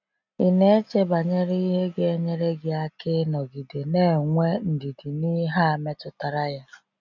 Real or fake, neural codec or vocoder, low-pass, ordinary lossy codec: real; none; 7.2 kHz; none